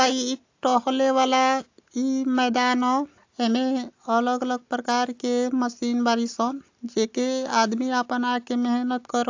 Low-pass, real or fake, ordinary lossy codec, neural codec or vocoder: 7.2 kHz; real; AAC, 48 kbps; none